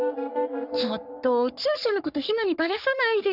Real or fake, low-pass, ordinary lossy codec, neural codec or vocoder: fake; 5.4 kHz; none; codec, 44.1 kHz, 3.4 kbps, Pupu-Codec